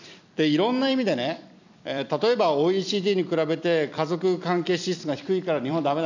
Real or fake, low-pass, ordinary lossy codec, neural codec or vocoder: fake; 7.2 kHz; none; vocoder, 44.1 kHz, 128 mel bands every 256 samples, BigVGAN v2